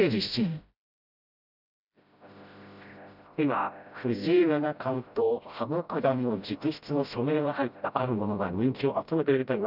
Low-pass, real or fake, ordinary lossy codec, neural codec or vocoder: 5.4 kHz; fake; none; codec, 16 kHz, 0.5 kbps, FreqCodec, smaller model